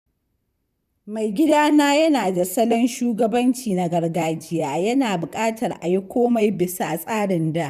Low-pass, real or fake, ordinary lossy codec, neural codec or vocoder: 14.4 kHz; fake; none; vocoder, 44.1 kHz, 128 mel bands, Pupu-Vocoder